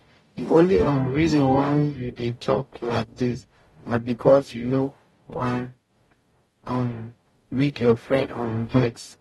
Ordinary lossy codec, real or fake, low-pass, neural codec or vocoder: AAC, 32 kbps; fake; 19.8 kHz; codec, 44.1 kHz, 0.9 kbps, DAC